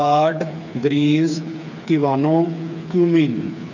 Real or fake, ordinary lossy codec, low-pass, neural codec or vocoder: fake; none; 7.2 kHz; codec, 16 kHz, 4 kbps, FreqCodec, smaller model